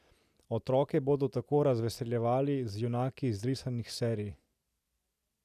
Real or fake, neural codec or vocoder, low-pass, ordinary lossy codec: real; none; 14.4 kHz; none